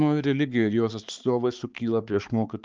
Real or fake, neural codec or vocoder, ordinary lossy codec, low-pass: fake; codec, 16 kHz, 4 kbps, X-Codec, HuBERT features, trained on balanced general audio; Opus, 24 kbps; 7.2 kHz